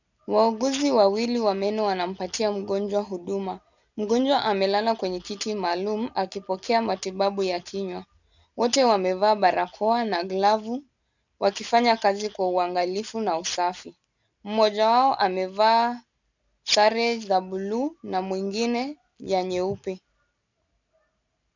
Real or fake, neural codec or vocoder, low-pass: real; none; 7.2 kHz